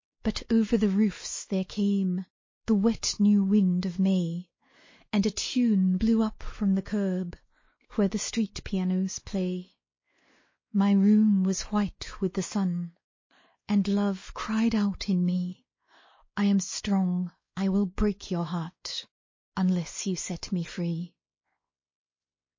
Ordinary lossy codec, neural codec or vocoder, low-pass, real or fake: MP3, 32 kbps; codec, 16 kHz, 2 kbps, X-Codec, WavLM features, trained on Multilingual LibriSpeech; 7.2 kHz; fake